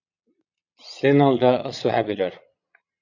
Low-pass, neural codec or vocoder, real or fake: 7.2 kHz; vocoder, 22.05 kHz, 80 mel bands, Vocos; fake